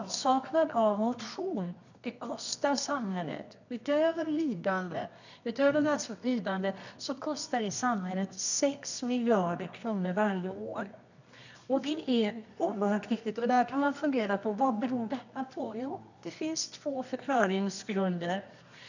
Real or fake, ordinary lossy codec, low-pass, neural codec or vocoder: fake; none; 7.2 kHz; codec, 24 kHz, 0.9 kbps, WavTokenizer, medium music audio release